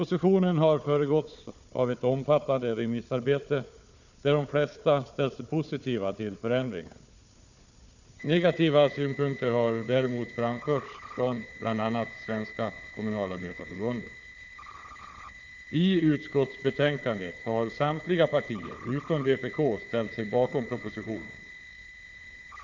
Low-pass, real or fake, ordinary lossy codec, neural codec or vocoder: 7.2 kHz; fake; none; codec, 16 kHz, 4 kbps, FunCodec, trained on Chinese and English, 50 frames a second